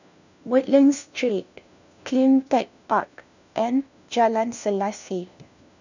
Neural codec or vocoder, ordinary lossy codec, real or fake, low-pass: codec, 16 kHz, 1 kbps, FunCodec, trained on LibriTTS, 50 frames a second; none; fake; 7.2 kHz